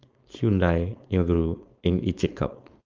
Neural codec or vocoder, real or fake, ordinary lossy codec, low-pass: codec, 16 kHz, 4.8 kbps, FACodec; fake; Opus, 24 kbps; 7.2 kHz